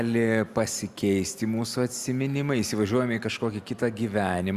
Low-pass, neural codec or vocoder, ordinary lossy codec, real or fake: 14.4 kHz; none; Opus, 64 kbps; real